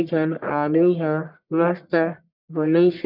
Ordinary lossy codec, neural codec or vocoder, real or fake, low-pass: none; codec, 44.1 kHz, 1.7 kbps, Pupu-Codec; fake; 5.4 kHz